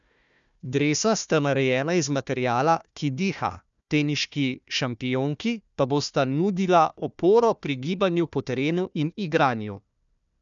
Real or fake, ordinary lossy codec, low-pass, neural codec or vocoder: fake; none; 7.2 kHz; codec, 16 kHz, 1 kbps, FunCodec, trained on Chinese and English, 50 frames a second